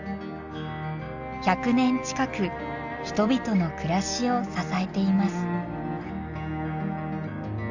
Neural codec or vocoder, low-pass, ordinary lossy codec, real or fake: none; 7.2 kHz; none; real